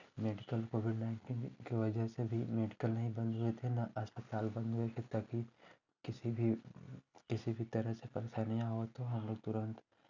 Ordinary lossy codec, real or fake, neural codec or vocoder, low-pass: none; real; none; 7.2 kHz